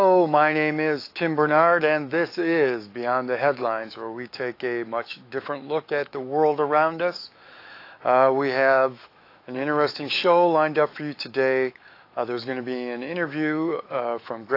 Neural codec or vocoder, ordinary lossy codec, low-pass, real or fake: autoencoder, 48 kHz, 128 numbers a frame, DAC-VAE, trained on Japanese speech; AAC, 32 kbps; 5.4 kHz; fake